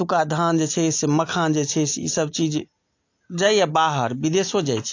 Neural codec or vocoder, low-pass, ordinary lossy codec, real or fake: none; 7.2 kHz; AAC, 48 kbps; real